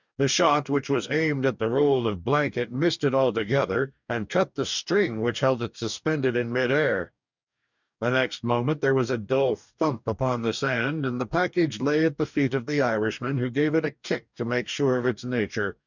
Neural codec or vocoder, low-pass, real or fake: codec, 44.1 kHz, 2.6 kbps, DAC; 7.2 kHz; fake